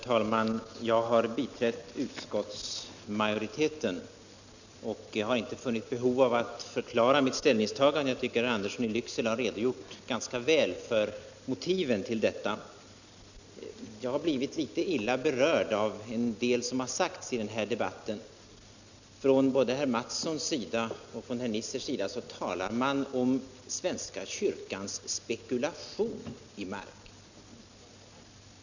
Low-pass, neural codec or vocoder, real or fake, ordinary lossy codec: 7.2 kHz; none; real; none